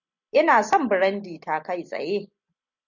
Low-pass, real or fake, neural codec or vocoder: 7.2 kHz; real; none